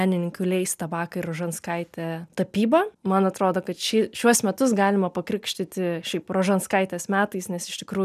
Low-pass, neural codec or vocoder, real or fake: 14.4 kHz; none; real